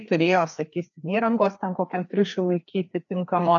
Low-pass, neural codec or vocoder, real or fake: 7.2 kHz; codec, 16 kHz, 2 kbps, FreqCodec, larger model; fake